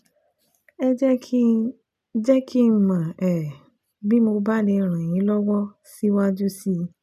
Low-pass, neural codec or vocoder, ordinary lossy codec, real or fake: 14.4 kHz; none; none; real